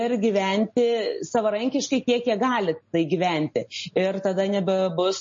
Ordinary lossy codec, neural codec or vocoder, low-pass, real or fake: MP3, 32 kbps; none; 7.2 kHz; real